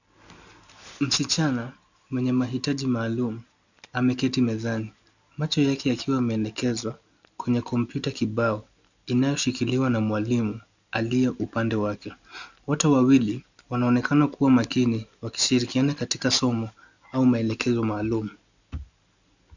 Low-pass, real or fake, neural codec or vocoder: 7.2 kHz; real; none